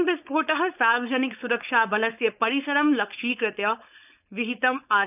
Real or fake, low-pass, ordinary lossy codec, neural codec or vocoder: fake; 3.6 kHz; none; codec, 16 kHz, 4.8 kbps, FACodec